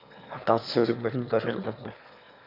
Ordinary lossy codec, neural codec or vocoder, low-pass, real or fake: AAC, 48 kbps; autoencoder, 22.05 kHz, a latent of 192 numbers a frame, VITS, trained on one speaker; 5.4 kHz; fake